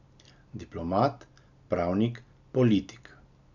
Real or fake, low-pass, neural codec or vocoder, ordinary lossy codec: real; 7.2 kHz; none; none